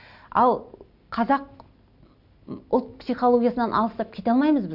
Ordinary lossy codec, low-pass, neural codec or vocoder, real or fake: none; 5.4 kHz; none; real